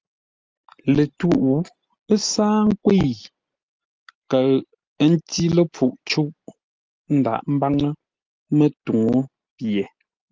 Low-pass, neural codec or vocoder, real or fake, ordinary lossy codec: 7.2 kHz; none; real; Opus, 24 kbps